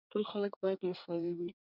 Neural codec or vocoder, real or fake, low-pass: codec, 24 kHz, 1 kbps, SNAC; fake; 5.4 kHz